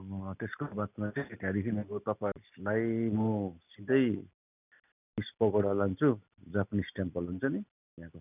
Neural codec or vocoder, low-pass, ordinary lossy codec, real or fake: none; 3.6 kHz; none; real